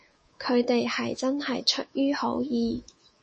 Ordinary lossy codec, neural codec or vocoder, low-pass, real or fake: MP3, 32 kbps; codec, 24 kHz, 3.1 kbps, DualCodec; 10.8 kHz; fake